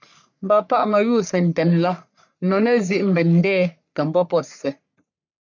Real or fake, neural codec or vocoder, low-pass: fake; codec, 44.1 kHz, 3.4 kbps, Pupu-Codec; 7.2 kHz